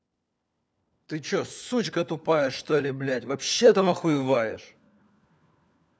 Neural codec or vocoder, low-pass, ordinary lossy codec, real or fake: codec, 16 kHz, 4 kbps, FunCodec, trained on LibriTTS, 50 frames a second; none; none; fake